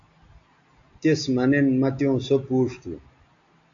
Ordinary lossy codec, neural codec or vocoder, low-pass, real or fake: MP3, 64 kbps; none; 7.2 kHz; real